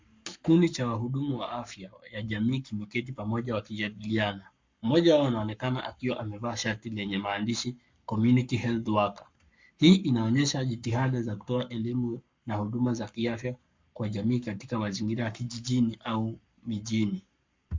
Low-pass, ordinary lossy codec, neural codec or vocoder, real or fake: 7.2 kHz; MP3, 64 kbps; codec, 44.1 kHz, 7.8 kbps, Pupu-Codec; fake